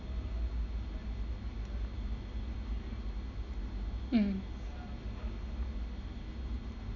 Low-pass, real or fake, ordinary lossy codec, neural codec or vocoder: 7.2 kHz; real; none; none